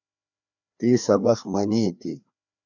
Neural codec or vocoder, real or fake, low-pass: codec, 16 kHz, 2 kbps, FreqCodec, larger model; fake; 7.2 kHz